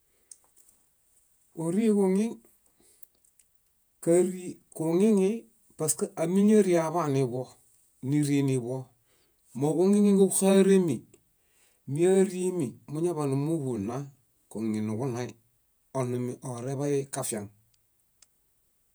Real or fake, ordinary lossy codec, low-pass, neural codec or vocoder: fake; none; none; vocoder, 48 kHz, 128 mel bands, Vocos